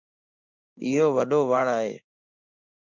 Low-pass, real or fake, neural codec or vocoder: 7.2 kHz; fake; codec, 16 kHz in and 24 kHz out, 1 kbps, XY-Tokenizer